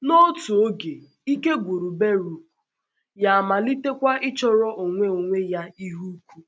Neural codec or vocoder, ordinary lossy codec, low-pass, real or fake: none; none; none; real